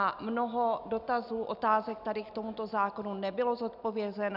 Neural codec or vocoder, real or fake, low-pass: none; real; 5.4 kHz